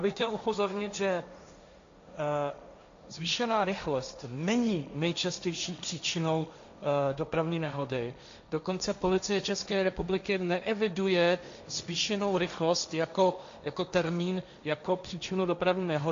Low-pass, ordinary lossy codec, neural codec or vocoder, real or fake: 7.2 kHz; AAC, 64 kbps; codec, 16 kHz, 1.1 kbps, Voila-Tokenizer; fake